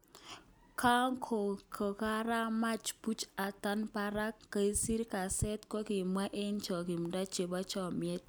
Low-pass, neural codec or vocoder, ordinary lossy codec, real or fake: none; none; none; real